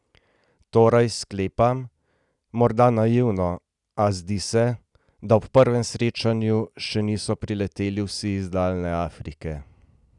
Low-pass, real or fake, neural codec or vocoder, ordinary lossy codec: 10.8 kHz; real; none; none